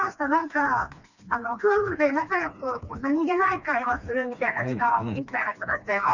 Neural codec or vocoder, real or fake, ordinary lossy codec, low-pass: codec, 16 kHz, 2 kbps, FreqCodec, smaller model; fake; none; 7.2 kHz